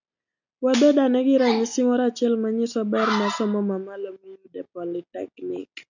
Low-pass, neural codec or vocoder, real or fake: 7.2 kHz; none; real